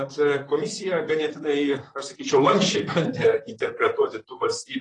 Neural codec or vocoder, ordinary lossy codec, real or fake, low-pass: vocoder, 44.1 kHz, 128 mel bands, Pupu-Vocoder; AAC, 32 kbps; fake; 10.8 kHz